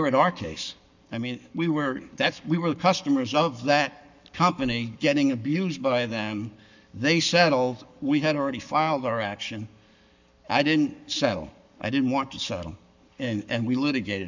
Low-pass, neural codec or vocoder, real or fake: 7.2 kHz; codec, 44.1 kHz, 7.8 kbps, Pupu-Codec; fake